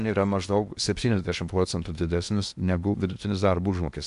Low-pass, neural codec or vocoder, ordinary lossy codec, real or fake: 10.8 kHz; codec, 16 kHz in and 24 kHz out, 0.8 kbps, FocalCodec, streaming, 65536 codes; AAC, 96 kbps; fake